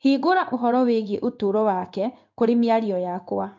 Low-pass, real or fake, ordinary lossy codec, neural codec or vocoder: 7.2 kHz; fake; MP3, 64 kbps; codec, 16 kHz in and 24 kHz out, 1 kbps, XY-Tokenizer